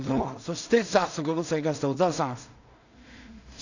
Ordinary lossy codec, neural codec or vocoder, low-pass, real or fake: none; codec, 16 kHz in and 24 kHz out, 0.4 kbps, LongCat-Audio-Codec, two codebook decoder; 7.2 kHz; fake